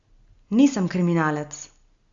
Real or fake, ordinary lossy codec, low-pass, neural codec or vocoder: real; Opus, 64 kbps; 7.2 kHz; none